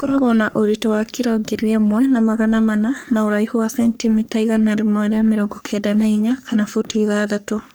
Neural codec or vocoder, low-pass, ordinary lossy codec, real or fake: codec, 44.1 kHz, 3.4 kbps, Pupu-Codec; none; none; fake